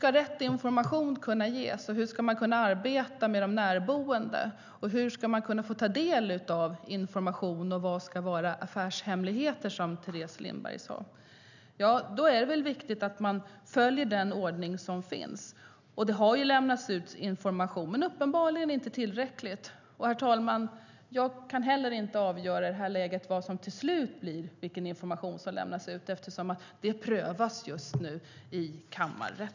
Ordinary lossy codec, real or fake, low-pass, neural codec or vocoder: none; real; 7.2 kHz; none